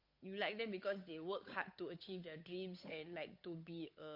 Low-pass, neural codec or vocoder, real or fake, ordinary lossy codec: 5.4 kHz; codec, 16 kHz, 8 kbps, FunCodec, trained on Chinese and English, 25 frames a second; fake; MP3, 32 kbps